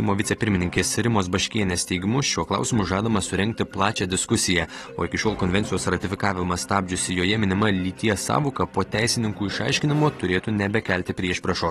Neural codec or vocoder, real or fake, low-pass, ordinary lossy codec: none; real; 19.8 kHz; AAC, 32 kbps